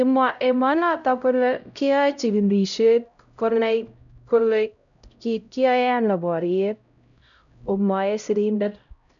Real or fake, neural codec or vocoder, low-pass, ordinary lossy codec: fake; codec, 16 kHz, 0.5 kbps, X-Codec, HuBERT features, trained on LibriSpeech; 7.2 kHz; none